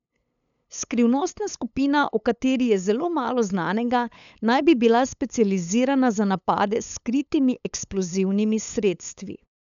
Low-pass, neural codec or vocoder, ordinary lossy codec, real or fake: 7.2 kHz; codec, 16 kHz, 8 kbps, FunCodec, trained on LibriTTS, 25 frames a second; none; fake